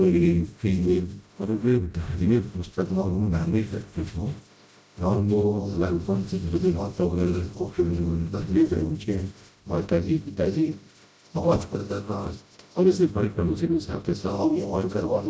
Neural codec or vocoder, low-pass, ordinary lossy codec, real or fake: codec, 16 kHz, 0.5 kbps, FreqCodec, smaller model; none; none; fake